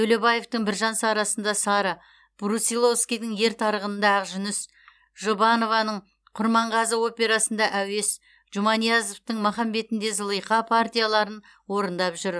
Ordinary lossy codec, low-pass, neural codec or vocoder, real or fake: none; none; none; real